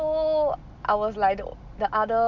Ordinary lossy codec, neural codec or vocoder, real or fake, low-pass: none; none; real; 7.2 kHz